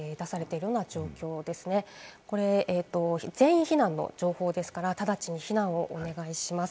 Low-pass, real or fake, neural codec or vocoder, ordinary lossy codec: none; real; none; none